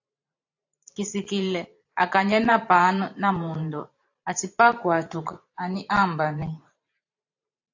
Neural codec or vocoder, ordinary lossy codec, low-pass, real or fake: vocoder, 44.1 kHz, 128 mel bands every 512 samples, BigVGAN v2; AAC, 48 kbps; 7.2 kHz; fake